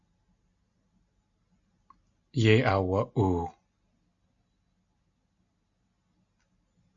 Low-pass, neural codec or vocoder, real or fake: 7.2 kHz; none; real